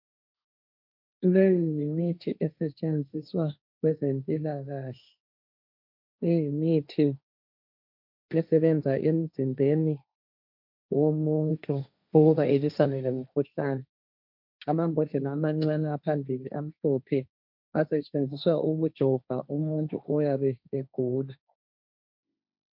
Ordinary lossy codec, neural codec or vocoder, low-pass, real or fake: MP3, 48 kbps; codec, 16 kHz, 1.1 kbps, Voila-Tokenizer; 5.4 kHz; fake